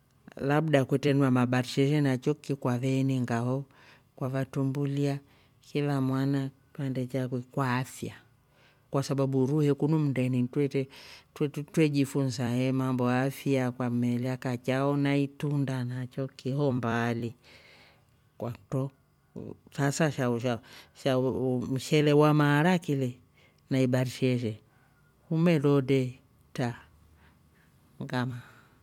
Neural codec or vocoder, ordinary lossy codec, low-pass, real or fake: vocoder, 44.1 kHz, 128 mel bands every 256 samples, BigVGAN v2; MP3, 96 kbps; 19.8 kHz; fake